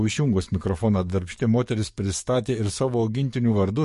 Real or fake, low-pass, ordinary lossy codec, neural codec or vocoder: fake; 14.4 kHz; MP3, 48 kbps; codec, 44.1 kHz, 7.8 kbps, DAC